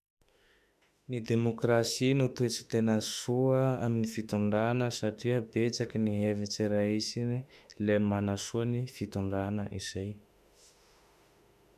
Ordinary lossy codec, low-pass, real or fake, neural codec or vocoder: none; 14.4 kHz; fake; autoencoder, 48 kHz, 32 numbers a frame, DAC-VAE, trained on Japanese speech